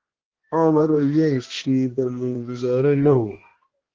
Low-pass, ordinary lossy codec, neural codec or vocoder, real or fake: 7.2 kHz; Opus, 16 kbps; codec, 16 kHz, 1 kbps, X-Codec, HuBERT features, trained on balanced general audio; fake